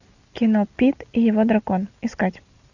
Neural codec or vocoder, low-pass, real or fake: none; 7.2 kHz; real